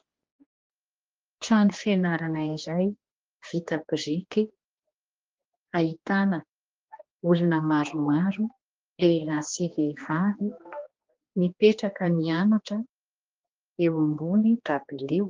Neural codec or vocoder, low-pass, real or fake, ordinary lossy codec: codec, 16 kHz, 2 kbps, X-Codec, HuBERT features, trained on general audio; 7.2 kHz; fake; Opus, 32 kbps